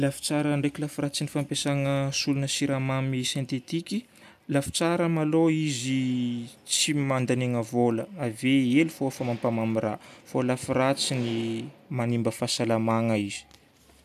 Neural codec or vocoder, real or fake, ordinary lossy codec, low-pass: none; real; none; 14.4 kHz